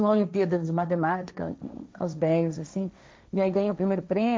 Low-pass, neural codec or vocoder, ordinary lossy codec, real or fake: none; codec, 16 kHz, 1.1 kbps, Voila-Tokenizer; none; fake